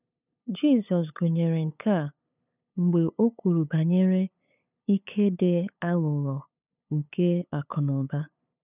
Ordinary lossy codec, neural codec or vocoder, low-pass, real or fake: none; codec, 16 kHz, 8 kbps, FunCodec, trained on LibriTTS, 25 frames a second; 3.6 kHz; fake